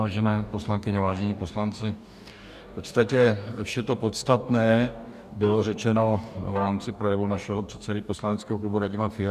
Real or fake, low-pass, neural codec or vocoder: fake; 14.4 kHz; codec, 44.1 kHz, 2.6 kbps, DAC